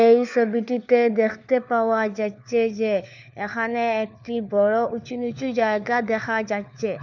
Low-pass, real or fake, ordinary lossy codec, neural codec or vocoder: 7.2 kHz; fake; none; codec, 16 kHz, 4 kbps, FunCodec, trained on LibriTTS, 50 frames a second